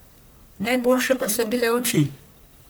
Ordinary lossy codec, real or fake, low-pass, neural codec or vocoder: none; fake; none; codec, 44.1 kHz, 1.7 kbps, Pupu-Codec